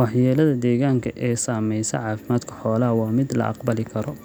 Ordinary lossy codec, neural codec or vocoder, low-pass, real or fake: none; none; none; real